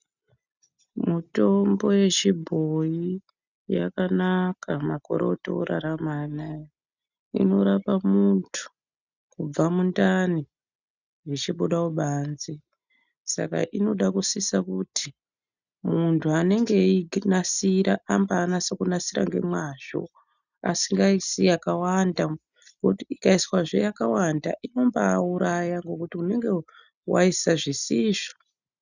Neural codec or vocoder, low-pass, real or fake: none; 7.2 kHz; real